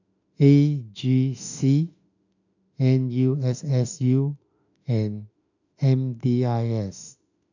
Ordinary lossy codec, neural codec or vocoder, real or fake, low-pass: none; autoencoder, 48 kHz, 32 numbers a frame, DAC-VAE, trained on Japanese speech; fake; 7.2 kHz